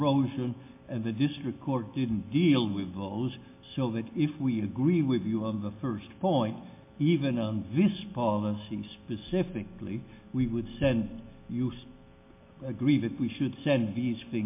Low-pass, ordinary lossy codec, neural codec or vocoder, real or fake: 3.6 kHz; MP3, 24 kbps; none; real